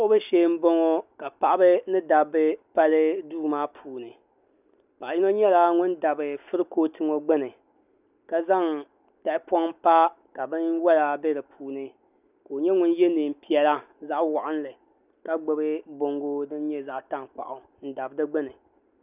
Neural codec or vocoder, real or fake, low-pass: none; real; 3.6 kHz